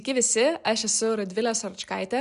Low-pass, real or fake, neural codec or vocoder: 10.8 kHz; real; none